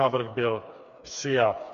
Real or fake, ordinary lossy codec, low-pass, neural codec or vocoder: fake; MP3, 48 kbps; 7.2 kHz; codec, 16 kHz, 4 kbps, FreqCodec, smaller model